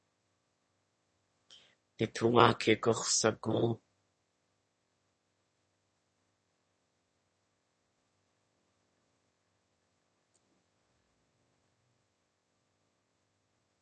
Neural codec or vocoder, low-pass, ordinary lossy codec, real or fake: autoencoder, 22.05 kHz, a latent of 192 numbers a frame, VITS, trained on one speaker; 9.9 kHz; MP3, 32 kbps; fake